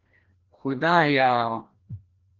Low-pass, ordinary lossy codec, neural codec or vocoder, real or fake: 7.2 kHz; Opus, 16 kbps; codec, 16 kHz, 1 kbps, FreqCodec, larger model; fake